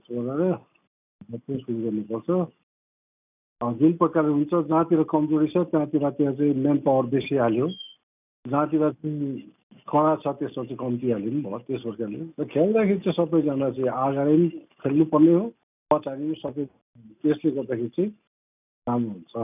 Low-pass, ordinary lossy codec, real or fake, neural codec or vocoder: 3.6 kHz; none; real; none